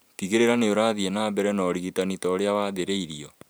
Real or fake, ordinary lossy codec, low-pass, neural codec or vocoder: fake; none; none; vocoder, 44.1 kHz, 128 mel bands every 512 samples, BigVGAN v2